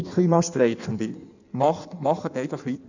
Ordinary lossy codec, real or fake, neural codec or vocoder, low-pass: none; fake; codec, 16 kHz in and 24 kHz out, 1.1 kbps, FireRedTTS-2 codec; 7.2 kHz